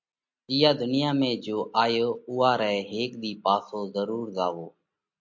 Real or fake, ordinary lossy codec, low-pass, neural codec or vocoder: real; MP3, 48 kbps; 7.2 kHz; none